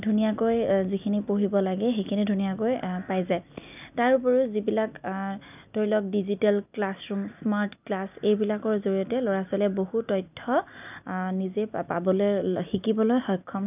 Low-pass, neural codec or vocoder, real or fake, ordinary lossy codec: 3.6 kHz; none; real; none